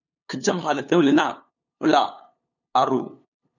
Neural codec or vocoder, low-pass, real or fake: codec, 16 kHz, 2 kbps, FunCodec, trained on LibriTTS, 25 frames a second; 7.2 kHz; fake